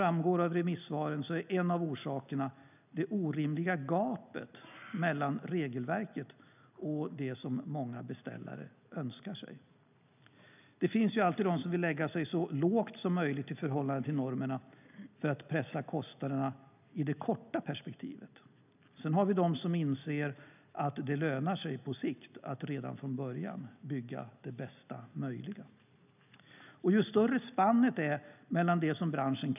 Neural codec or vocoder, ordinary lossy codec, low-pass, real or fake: none; none; 3.6 kHz; real